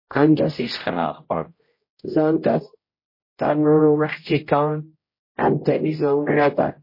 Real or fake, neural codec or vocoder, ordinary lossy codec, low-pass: fake; codec, 16 kHz, 0.5 kbps, X-Codec, HuBERT features, trained on general audio; MP3, 24 kbps; 5.4 kHz